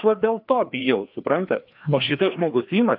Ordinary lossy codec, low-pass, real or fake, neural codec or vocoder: AAC, 48 kbps; 5.4 kHz; fake; codec, 16 kHz, 2 kbps, FreqCodec, larger model